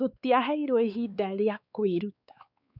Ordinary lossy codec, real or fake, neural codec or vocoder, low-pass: none; fake; codec, 16 kHz, 4 kbps, X-Codec, WavLM features, trained on Multilingual LibriSpeech; 5.4 kHz